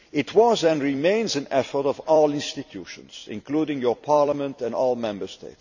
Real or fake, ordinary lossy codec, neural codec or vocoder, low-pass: fake; none; vocoder, 44.1 kHz, 128 mel bands every 256 samples, BigVGAN v2; 7.2 kHz